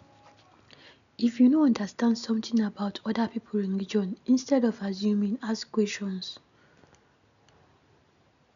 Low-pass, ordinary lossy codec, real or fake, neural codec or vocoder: 7.2 kHz; none; real; none